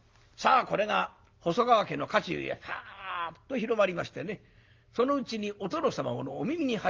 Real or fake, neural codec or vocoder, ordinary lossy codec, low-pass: real; none; Opus, 32 kbps; 7.2 kHz